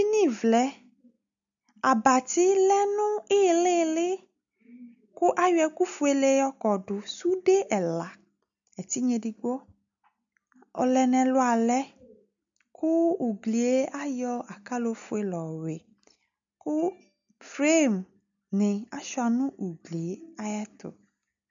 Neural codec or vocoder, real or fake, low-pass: none; real; 7.2 kHz